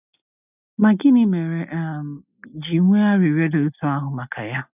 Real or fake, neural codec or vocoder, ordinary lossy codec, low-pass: real; none; none; 3.6 kHz